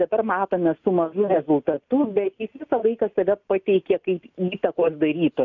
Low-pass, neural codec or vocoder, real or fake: 7.2 kHz; none; real